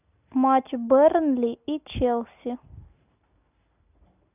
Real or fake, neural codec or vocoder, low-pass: real; none; 3.6 kHz